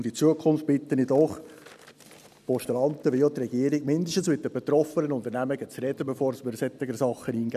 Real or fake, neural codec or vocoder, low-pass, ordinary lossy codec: real; none; 14.4 kHz; none